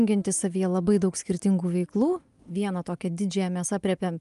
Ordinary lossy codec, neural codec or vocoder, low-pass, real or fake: Opus, 32 kbps; none; 10.8 kHz; real